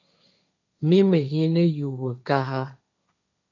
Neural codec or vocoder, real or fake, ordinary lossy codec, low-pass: codec, 16 kHz, 1.1 kbps, Voila-Tokenizer; fake; AAC, 48 kbps; 7.2 kHz